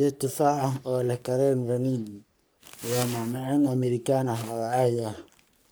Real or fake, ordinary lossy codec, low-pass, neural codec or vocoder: fake; none; none; codec, 44.1 kHz, 3.4 kbps, Pupu-Codec